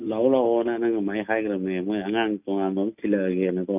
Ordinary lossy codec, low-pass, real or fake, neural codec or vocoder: none; 3.6 kHz; real; none